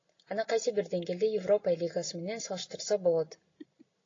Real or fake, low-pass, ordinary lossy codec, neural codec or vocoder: real; 7.2 kHz; AAC, 32 kbps; none